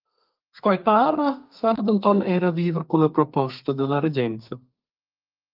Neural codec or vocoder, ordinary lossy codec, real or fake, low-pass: codec, 32 kHz, 1.9 kbps, SNAC; Opus, 32 kbps; fake; 5.4 kHz